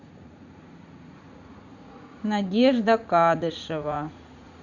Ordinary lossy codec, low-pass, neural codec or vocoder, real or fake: Opus, 64 kbps; 7.2 kHz; vocoder, 44.1 kHz, 80 mel bands, Vocos; fake